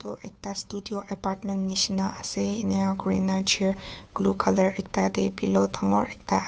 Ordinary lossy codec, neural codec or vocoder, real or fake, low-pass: none; codec, 16 kHz, 2 kbps, FunCodec, trained on Chinese and English, 25 frames a second; fake; none